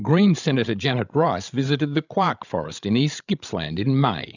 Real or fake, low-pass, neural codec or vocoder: fake; 7.2 kHz; codec, 16 kHz, 16 kbps, FreqCodec, larger model